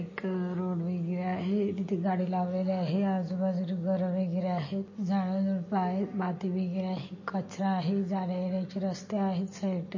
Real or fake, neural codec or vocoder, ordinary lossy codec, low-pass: real; none; MP3, 32 kbps; 7.2 kHz